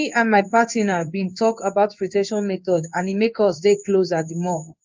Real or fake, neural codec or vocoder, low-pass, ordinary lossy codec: fake; codec, 16 kHz in and 24 kHz out, 1 kbps, XY-Tokenizer; 7.2 kHz; Opus, 24 kbps